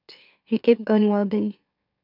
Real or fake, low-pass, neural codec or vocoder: fake; 5.4 kHz; autoencoder, 44.1 kHz, a latent of 192 numbers a frame, MeloTTS